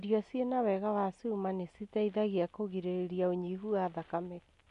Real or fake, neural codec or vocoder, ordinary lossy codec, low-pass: real; none; AAC, 96 kbps; 10.8 kHz